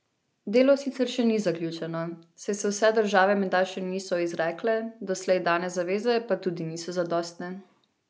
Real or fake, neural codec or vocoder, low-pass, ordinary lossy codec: real; none; none; none